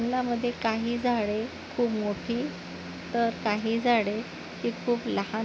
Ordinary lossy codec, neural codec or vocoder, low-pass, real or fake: none; none; none; real